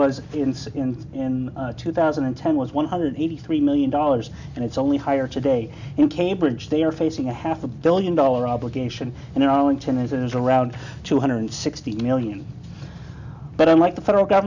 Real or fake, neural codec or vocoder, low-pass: real; none; 7.2 kHz